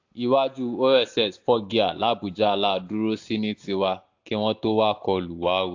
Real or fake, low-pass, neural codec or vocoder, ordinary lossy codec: real; 7.2 kHz; none; AAC, 48 kbps